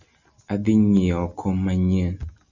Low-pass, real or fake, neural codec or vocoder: 7.2 kHz; real; none